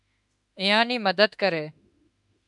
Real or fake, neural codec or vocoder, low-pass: fake; autoencoder, 48 kHz, 32 numbers a frame, DAC-VAE, trained on Japanese speech; 10.8 kHz